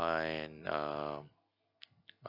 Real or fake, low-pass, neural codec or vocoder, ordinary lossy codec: real; 5.4 kHz; none; none